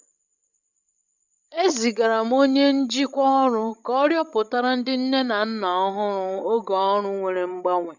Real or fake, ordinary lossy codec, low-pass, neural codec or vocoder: fake; none; 7.2 kHz; codec, 16 kHz, 16 kbps, FreqCodec, larger model